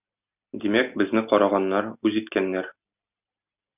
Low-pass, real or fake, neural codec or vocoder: 3.6 kHz; real; none